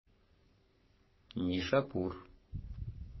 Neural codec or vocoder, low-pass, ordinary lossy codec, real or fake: codec, 44.1 kHz, 3.4 kbps, Pupu-Codec; 7.2 kHz; MP3, 24 kbps; fake